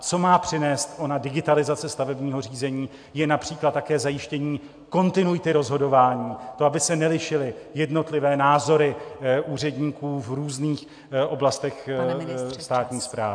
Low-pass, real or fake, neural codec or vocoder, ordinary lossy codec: 9.9 kHz; real; none; AAC, 64 kbps